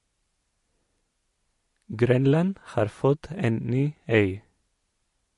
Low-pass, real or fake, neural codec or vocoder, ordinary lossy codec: 14.4 kHz; real; none; MP3, 48 kbps